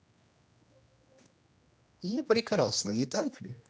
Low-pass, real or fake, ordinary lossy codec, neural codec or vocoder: none; fake; none; codec, 16 kHz, 1 kbps, X-Codec, HuBERT features, trained on general audio